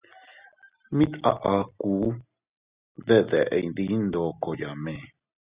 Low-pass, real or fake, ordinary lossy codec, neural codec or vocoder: 3.6 kHz; real; Opus, 64 kbps; none